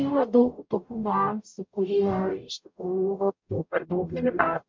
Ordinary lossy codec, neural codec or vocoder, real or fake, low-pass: MP3, 64 kbps; codec, 44.1 kHz, 0.9 kbps, DAC; fake; 7.2 kHz